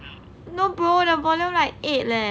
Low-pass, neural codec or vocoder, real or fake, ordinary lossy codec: none; none; real; none